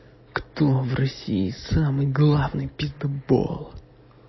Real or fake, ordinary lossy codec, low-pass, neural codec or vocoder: real; MP3, 24 kbps; 7.2 kHz; none